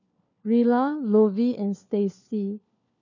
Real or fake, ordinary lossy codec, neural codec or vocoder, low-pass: fake; none; codec, 16 kHz, 4 kbps, FunCodec, trained on LibriTTS, 50 frames a second; 7.2 kHz